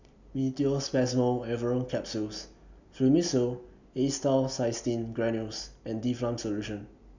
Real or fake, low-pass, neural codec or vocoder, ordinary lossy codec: real; 7.2 kHz; none; none